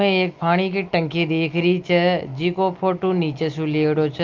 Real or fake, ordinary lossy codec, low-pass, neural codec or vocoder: real; Opus, 32 kbps; 7.2 kHz; none